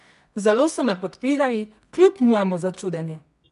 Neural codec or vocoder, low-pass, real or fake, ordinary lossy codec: codec, 24 kHz, 0.9 kbps, WavTokenizer, medium music audio release; 10.8 kHz; fake; none